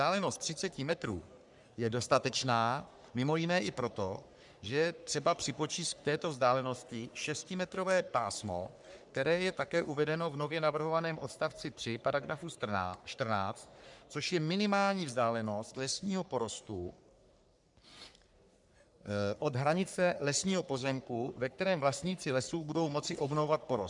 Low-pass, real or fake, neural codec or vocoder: 10.8 kHz; fake; codec, 44.1 kHz, 3.4 kbps, Pupu-Codec